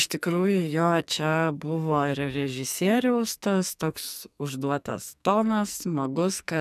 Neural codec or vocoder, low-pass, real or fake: codec, 44.1 kHz, 2.6 kbps, SNAC; 14.4 kHz; fake